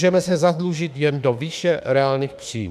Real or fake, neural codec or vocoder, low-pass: fake; autoencoder, 48 kHz, 32 numbers a frame, DAC-VAE, trained on Japanese speech; 14.4 kHz